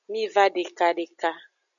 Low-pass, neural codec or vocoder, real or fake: 7.2 kHz; none; real